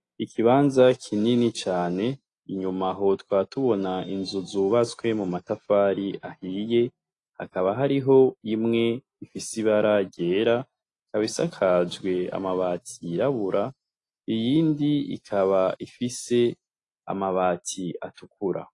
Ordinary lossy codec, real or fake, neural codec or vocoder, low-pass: AAC, 48 kbps; real; none; 10.8 kHz